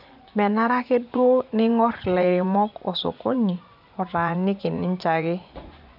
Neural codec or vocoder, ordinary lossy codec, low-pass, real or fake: vocoder, 24 kHz, 100 mel bands, Vocos; none; 5.4 kHz; fake